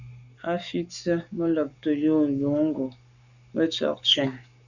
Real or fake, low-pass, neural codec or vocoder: fake; 7.2 kHz; codec, 44.1 kHz, 7.8 kbps, Pupu-Codec